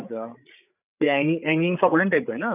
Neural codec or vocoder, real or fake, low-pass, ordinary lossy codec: codec, 16 kHz, 8 kbps, FreqCodec, larger model; fake; 3.6 kHz; none